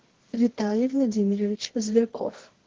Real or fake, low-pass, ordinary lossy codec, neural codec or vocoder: fake; 7.2 kHz; Opus, 16 kbps; codec, 24 kHz, 0.9 kbps, WavTokenizer, medium music audio release